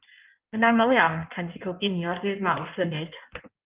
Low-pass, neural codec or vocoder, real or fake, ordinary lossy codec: 3.6 kHz; codec, 16 kHz in and 24 kHz out, 1.1 kbps, FireRedTTS-2 codec; fake; Opus, 32 kbps